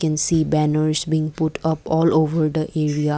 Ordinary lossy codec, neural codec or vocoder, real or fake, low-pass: none; none; real; none